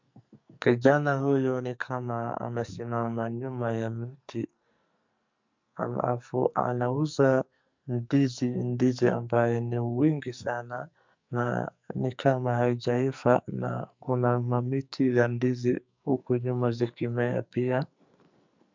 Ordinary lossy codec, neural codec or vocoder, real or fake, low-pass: MP3, 64 kbps; codec, 44.1 kHz, 2.6 kbps, SNAC; fake; 7.2 kHz